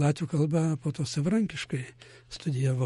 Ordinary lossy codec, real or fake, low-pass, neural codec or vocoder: MP3, 48 kbps; fake; 19.8 kHz; autoencoder, 48 kHz, 128 numbers a frame, DAC-VAE, trained on Japanese speech